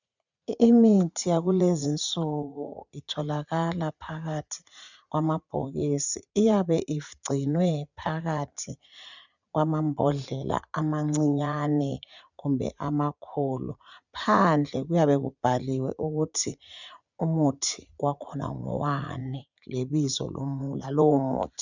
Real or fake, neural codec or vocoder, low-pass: fake; vocoder, 44.1 kHz, 80 mel bands, Vocos; 7.2 kHz